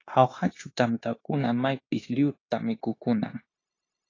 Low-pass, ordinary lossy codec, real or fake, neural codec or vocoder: 7.2 kHz; AAC, 32 kbps; fake; codec, 16 kHz, 0.9 kbps, LongCat-Audio-Codec